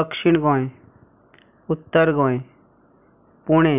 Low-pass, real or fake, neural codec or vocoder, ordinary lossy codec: 3.6 kHz; real; none; none